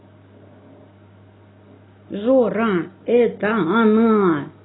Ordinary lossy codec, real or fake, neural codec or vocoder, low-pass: AAC, 16 kbps; real; none; 7.2 kHz